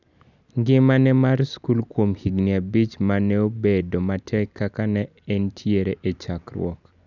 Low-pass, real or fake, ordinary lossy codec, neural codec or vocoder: 7.2 kHz; real; none; none